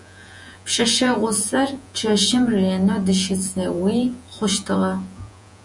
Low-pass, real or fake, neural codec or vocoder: 10.8 kHz; fake; vocoder, 48 kHz, 128 mel bands, Vocos